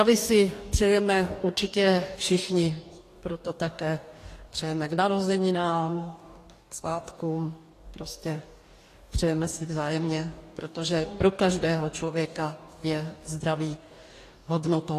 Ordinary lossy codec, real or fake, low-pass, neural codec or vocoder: AAC, 48 kbps; fake; 14.4 kHz; codec, 44.1 kHz, 2.6 kbps, DAC